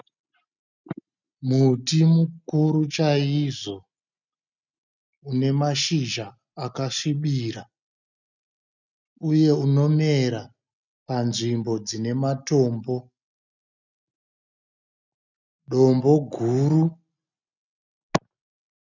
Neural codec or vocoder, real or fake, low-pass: none; real; 7.2 kHz